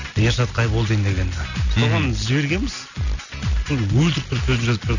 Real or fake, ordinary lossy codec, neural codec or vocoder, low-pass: real; none; none; 7.2 kHz